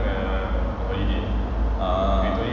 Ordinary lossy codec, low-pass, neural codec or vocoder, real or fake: none; 7.2 kHz; none; real